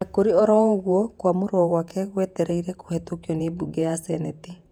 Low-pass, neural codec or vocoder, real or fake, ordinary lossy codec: 19.8 kHz; vocoder, 48 kHz, 128 mel bands, Vocos; fake; none